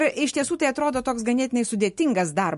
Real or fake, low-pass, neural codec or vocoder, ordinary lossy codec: real; 14.4 kHz; none; MP3, 48 kbps